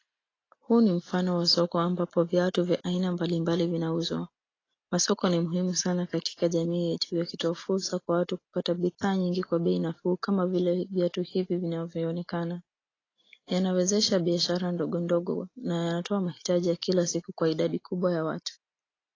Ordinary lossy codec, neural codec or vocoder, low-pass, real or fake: AAC, 32 kbps; none; 7.2 kHz; real